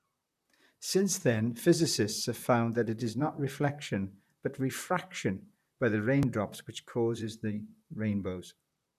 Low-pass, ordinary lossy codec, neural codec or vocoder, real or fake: 14.4 kHz; none; vocoder, 44.1 kHz, 128 mel bands, Pupu-Vocoder; fake